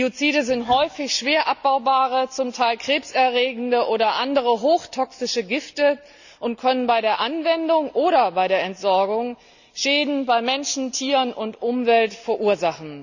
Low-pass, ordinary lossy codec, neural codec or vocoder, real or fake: 7.2 kHz; none; none; real